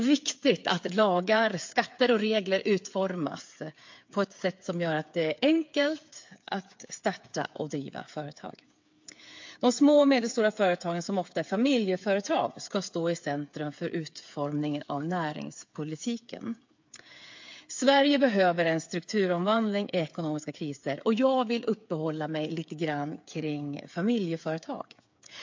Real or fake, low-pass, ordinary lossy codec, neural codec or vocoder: fake; 7.2 kHz; MP3, 48 kbps; codec, 16 kHz, 8 kbps, FreqCodec, smaller model